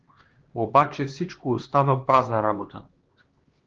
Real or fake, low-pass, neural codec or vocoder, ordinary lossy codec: fake; 7.2 kHz; codec, 16 kHz, 2 kbps, X-Codec, HuBERT features, trained on LibriSpeech; Opus, 16 kbps